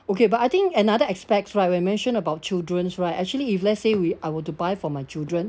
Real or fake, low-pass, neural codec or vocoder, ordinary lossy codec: real; none; none; none